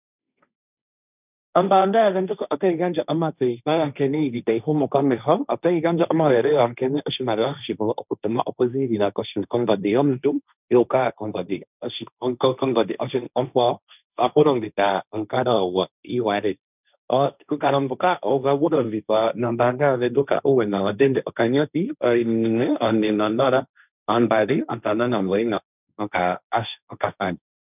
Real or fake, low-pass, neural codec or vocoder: fake; 3.6 kHz; codec, 16 kHz, 1.1 kbps, Voila-Tokenizer